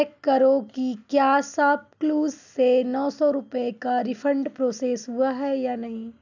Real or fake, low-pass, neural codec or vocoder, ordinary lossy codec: real; 7.2 kHz; none; none